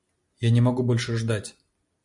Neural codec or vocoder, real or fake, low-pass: none; real; 10.8 kHz